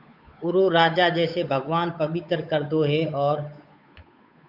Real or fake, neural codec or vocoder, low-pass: fake; codec, 16 kHz, 8 kbps, FunCodec, trained on Chinese and English, 25 frames a second; 5.4 kHz